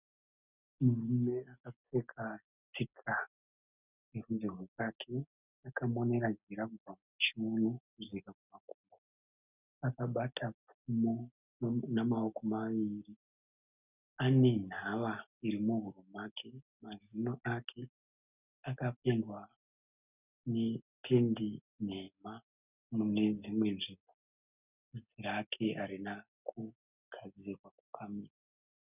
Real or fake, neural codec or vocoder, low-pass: real; none; 3.6 kHz